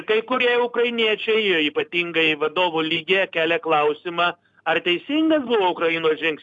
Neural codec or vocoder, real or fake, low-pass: none; real; 10.8 kHz